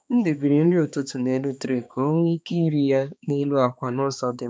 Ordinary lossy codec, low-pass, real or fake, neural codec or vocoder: none; none; fake; codec, 16 kHz, 2 kbps, X-Codec, HuBERT features, trained on balanced general audio